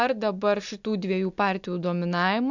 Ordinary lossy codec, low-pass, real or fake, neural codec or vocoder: MP3, 64 kbps; 7.2 kHz; real; none